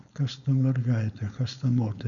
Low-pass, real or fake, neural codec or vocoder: 7.2 kHz; fake; codec, 16 kHz, 4.8 kbps, FACodec